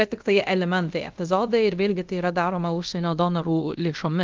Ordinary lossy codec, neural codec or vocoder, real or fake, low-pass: Opus, 32 kbps; codec, 24 kHz, 0.5 kbps, DualCodec; fake; 7.2 kHz